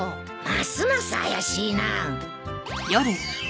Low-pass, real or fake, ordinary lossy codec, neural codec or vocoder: none; real; none; none